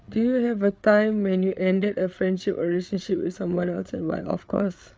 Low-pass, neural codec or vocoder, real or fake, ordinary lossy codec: none; codec, 16 kHz, 8 kbps, FreqCodec, larger model; fake; none